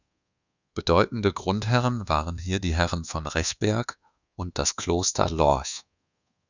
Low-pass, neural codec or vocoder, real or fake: 7.2 kHz; codec, 24 kHz, 1.2 kbps, DualCodec; fake